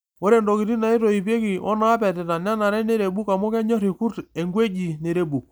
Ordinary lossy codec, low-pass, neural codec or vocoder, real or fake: none; none; none; real